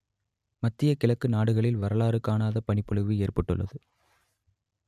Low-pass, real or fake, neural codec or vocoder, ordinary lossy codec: 14.4 kHz; real; none; none